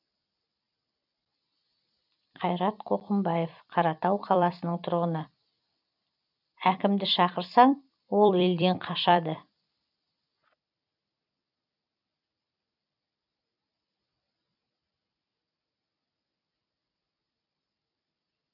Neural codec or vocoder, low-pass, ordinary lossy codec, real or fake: vocoder, 44.1 kHz, 128 mel bands every 256 samples, BigVGAN v2; 5.4 kHz; none; fake